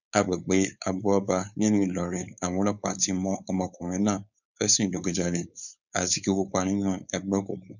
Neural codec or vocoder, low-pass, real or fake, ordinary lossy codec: codec, 16 kHz, 4.8 kbps, FACodec; 7.2 kHz; fake; none